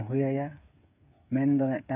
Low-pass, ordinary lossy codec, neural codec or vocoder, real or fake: 3.6 kHz; AAC, 32 kbps; codec, 16 kHz, 8 kbps, FreqCodec, smaller model; fake